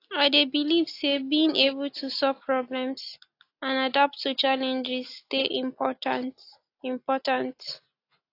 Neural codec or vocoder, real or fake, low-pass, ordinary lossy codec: none; real; 5.4 kHz; AAC, 32 kbps